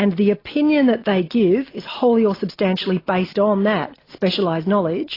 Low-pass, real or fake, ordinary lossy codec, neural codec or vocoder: 5.4 kHz; real; AAC, 24 kbps; none